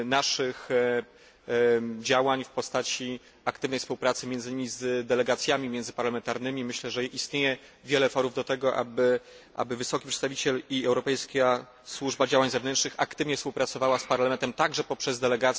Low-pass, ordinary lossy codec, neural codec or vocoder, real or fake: none; none; none; real